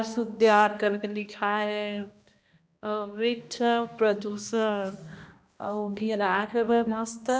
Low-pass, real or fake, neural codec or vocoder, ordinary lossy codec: none; fake; codec, 16 kHz, 1 kbps, X-Codec, HuBERT features, trained on balanced general audio; none